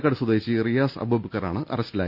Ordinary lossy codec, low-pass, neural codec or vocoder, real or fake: Opus, 64 kbps; 5.4 kHz; none; real